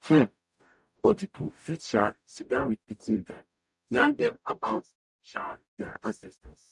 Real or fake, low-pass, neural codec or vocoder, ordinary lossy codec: fake; 10.8 kHz; codec, 44.1 kHz, 0.9 kbps, DAC; none